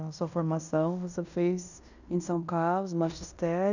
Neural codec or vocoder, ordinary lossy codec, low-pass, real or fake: codec, 16 kHz in and 24 kHz out, 0.9 kbps, LongCat-Audio-Codec, fine tuned four codebook decoder; none; 7.2 kHz; fake